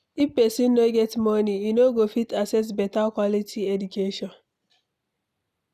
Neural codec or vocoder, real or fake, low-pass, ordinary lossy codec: none; real; 14.4 kHz; none